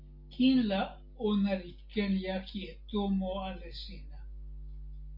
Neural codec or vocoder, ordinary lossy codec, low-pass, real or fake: none; MP3, 48 kbps; 5.4 kHz; real